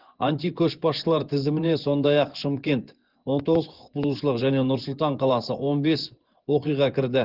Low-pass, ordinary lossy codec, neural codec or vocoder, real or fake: 5.4 kHz; Opus, 16 kbps; vocoder, 24 kHz, 100 mel bands, Vocos; fake